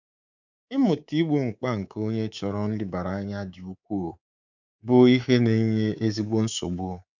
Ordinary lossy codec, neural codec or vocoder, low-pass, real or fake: none; codec, 24 kHz, 3.1 kbps, DualCodec; 7.2 kHz; fake